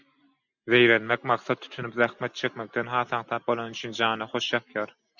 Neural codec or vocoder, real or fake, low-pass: none; real; 7.2 kHz